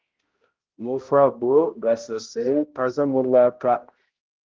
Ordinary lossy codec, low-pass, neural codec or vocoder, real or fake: Opus, 16 kbps; 7.2 kHz; codec, 16 kHz, 0.5 kbps, X-Codec, HuBERT features, trained on balanced general audio; fake